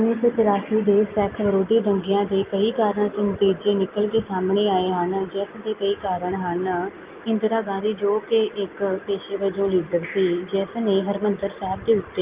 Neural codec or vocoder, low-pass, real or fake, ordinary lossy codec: none; 3.6 kHz; real; Opus, 32 kbps